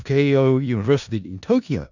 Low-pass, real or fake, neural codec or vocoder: 7.2 kHz; fake; codec, 16 kHz in and 24 kHz out, 0.4 kbps, LongCat-Audio-Codec, four codebook decoder